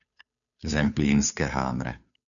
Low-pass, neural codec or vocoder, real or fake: 7.2 kHz; codec, 16 kHz, 2 kbps, FunCodec, trained on Chinese and English, 25 frames a second; fake